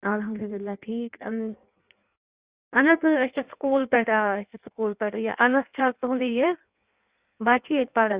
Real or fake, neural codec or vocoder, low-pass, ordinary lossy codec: fake; codec, 16 kHz in and 24 kHz out, 1.1 kbps, FireRedTTS-2 codec; 3.6 kHz; Opus, 64 kbps